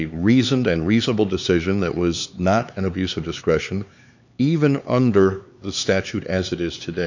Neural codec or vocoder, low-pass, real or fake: codec, 16 kHz, 4 kbps, X-Codec, HuBERT features, trained on LibriSpeech; 7.2 kHz; fake